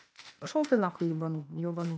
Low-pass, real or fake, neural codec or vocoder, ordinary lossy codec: none; fake; codec, 16 kHz, 0.8 kbps, ZipCodec; none